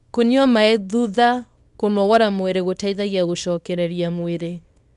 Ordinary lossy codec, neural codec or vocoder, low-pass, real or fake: none; codec, 24 kHz, 0.9 kbps, WavTokenizer, small release; 10.8 kHz; fake